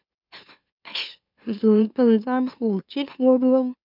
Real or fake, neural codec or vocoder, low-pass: fake; autoencoder, 44.1 kHz, a latent of 192 numbers a frame, MeloTTS; 5.4 kHz